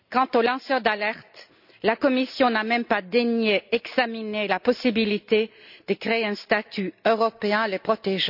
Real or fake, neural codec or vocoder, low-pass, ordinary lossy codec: real; none; 5.4 kHz; none